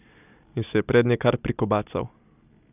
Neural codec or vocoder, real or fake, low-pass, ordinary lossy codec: none; real; 3.6 kHz; none